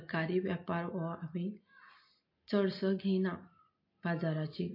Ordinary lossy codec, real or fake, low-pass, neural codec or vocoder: MP3, 32 kbps; real; 5.4 kHz; none